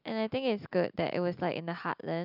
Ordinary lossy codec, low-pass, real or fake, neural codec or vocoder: none; 5.4 kHz; real; none